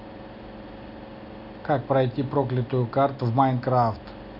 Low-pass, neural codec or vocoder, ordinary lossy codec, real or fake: 5.4 kHz; none; none; real